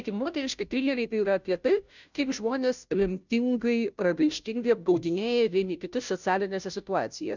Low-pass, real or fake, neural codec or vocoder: 7.2 kHz; fake; codec, 16 kHz, 0.5 kbps, FunCodec, trained on Chinese and English, 25 frames a second